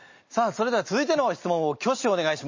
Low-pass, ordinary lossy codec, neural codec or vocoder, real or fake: 7.2 kHz; MP3, 32 kbps; none; real